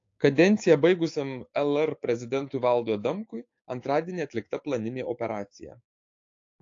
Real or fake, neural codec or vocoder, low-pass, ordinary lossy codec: fake; codec, 16 kHz, 6 kbps, DAC; 7.2 kHz; AAC, 48 kbps